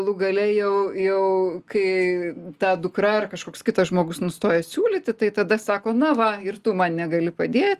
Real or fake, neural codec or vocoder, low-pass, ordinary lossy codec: real; none; 14.4 kHz; Opus, 32 kbps